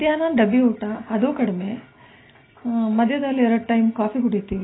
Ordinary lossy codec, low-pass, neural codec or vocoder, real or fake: AAC, 16 kbps; 7.2 kHz; none; real